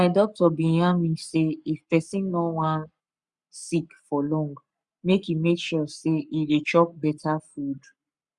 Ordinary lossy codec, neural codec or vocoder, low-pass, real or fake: Opus, 64 kbps; codec, 44.1 kHz, 7.8 kbps, Pupu-Codec; 10.8 kHz; fake